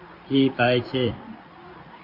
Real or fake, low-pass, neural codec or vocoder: fake; 5.4 kHz; codec, 16 kHz in and 24 kHz out, 1 kbps, XY-Tokenizer